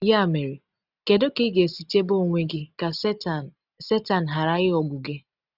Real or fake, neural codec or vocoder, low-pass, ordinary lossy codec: real; none; 5.4 kHz; none